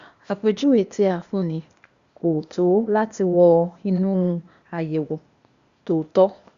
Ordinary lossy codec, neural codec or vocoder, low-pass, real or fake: Opus, 64 kbps; codec, 16 kHz, 0.8 kbps, ZipCodec; 7.2 kHz; fake